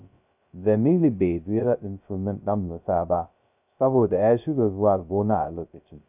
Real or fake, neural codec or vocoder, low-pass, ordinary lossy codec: fake; codec, 16 kHz, 0.2 kbps, FocalCodec; 3.6 kHz; none